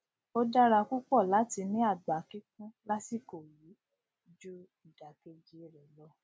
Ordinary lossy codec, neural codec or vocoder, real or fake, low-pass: none; none; real; none